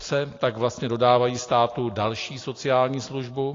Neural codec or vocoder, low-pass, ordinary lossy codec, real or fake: none; 7.2 kHz; AAC, 32 kbps; real